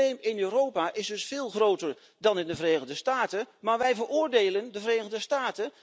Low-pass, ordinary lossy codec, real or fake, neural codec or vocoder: none; none; real; none